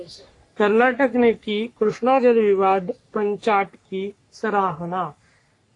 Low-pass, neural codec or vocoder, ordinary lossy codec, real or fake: 10.8 kHz; codec, 44.1 kHz, 3.4 kbps, Pupu-Codec; AAC, 48 kbps; fake